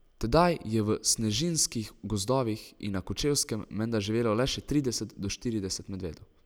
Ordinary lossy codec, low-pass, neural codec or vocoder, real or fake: none; none; none; real